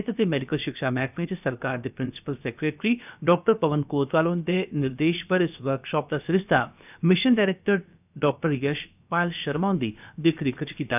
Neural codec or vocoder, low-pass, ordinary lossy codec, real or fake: codec, 16 kHz, about 1 kbps, DyCAST, with the encoder's durations; 3.6 kHz; none; fake